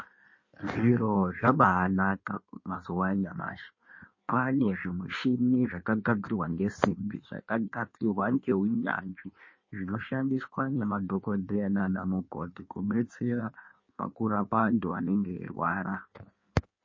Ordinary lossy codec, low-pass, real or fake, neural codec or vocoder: MP3, 32 kbps; 7.2 kHz; fake; codec, 16 kHz in and 24 kHz out, 1.1 kbps, FireRedTTS-2 codec